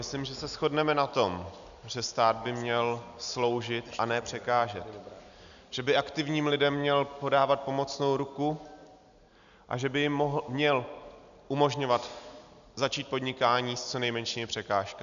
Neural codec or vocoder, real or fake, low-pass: none; real; 7.2 kHz